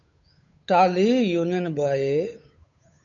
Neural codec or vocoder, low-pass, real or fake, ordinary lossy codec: codec, 16 kHz, 8 kbps, FunCodec, trained on Chinese and English, 25 frames a second; 7.2 kHz; fake; MP3, 96 kbps